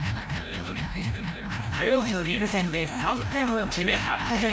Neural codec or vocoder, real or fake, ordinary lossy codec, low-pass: codec, 16 kHz, 0.5 kbps, FreqCodec, larger model; fake; none; none